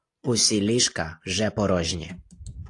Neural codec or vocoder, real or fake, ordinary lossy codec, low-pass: none; real; AAC, 48 kbps; 10.8 kHz